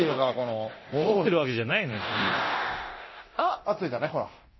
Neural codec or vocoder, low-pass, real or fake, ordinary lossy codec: codec, 24 kHz, 0.9 kbps, DualCodec; 7.2 kHz; fake; MP3, 24 kbps